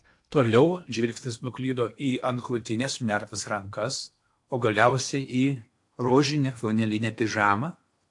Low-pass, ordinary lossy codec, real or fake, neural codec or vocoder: 10.8 kHz; AAC, 64 kbps; fake; codec, 16 kHz in and 24 kHz out, 0.8 kbps, FocalCodec, streaming, 65536 codes